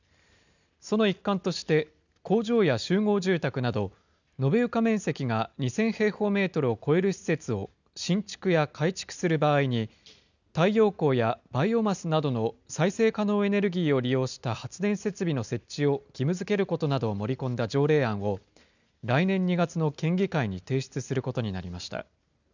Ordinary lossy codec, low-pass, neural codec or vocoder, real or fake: none; 7.2 kHz; none; real